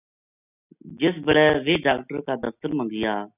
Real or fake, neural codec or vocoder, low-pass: real; none; 3.6 kHz